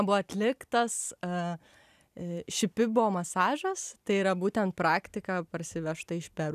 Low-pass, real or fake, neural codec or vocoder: 14.4 kHz; real; none